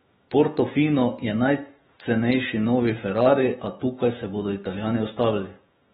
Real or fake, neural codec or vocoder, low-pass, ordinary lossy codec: fake; autoencoder, 48 kHz, 128 numbers a frame, DAC-VAE, trained on Japanese speech; 19.8 kHz; AAC, 16 kbps